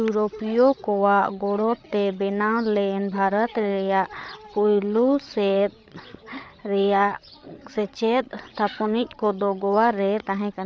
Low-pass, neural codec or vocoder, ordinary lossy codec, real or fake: none; codec, 16 kHz, 8 kbps, FreqCodec, larger model; none; fake